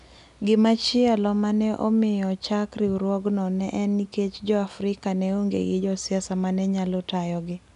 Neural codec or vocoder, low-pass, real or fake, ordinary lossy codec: none; 10.8 kHz; real; none